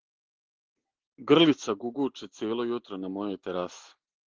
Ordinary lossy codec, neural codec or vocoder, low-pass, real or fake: Opus, 16 kbps; none; 7.2 kHz; real